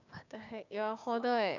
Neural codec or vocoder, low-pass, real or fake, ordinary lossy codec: codec, 16 kHz, 6 kbps, DAC; 7.2 kHz; fake; none